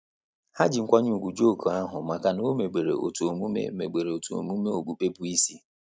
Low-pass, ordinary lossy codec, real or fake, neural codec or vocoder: none; none; real; none